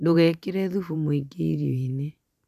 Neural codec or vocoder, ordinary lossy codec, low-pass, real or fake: vocoder, 44.1 kHz, 128 mel bands every 512 samples, BigVGAN v2; none; 14.4 kHz; fake